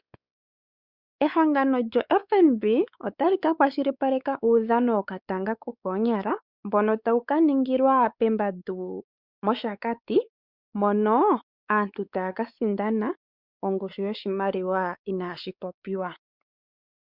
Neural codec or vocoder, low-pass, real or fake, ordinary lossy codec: codec, 16 kHz, 4 kbps, X-Codec, WavLM features, trained on Multilingual LibriSpeech; 5.4 kHz; fake; Opus, 64 kbps